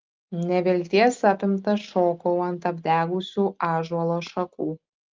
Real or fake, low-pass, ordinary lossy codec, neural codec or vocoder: real; 7.2 kHz; Opus, 24 kbps; none